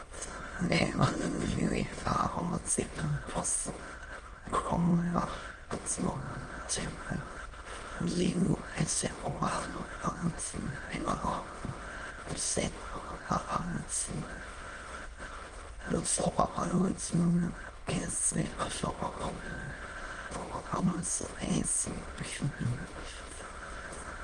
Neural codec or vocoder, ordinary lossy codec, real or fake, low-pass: autoencoder, 22.05 kHz, a latent of 192 numbers a frame, VITS, trained on many speakers; Opus, 24 kbps; fake; 9.9 kHz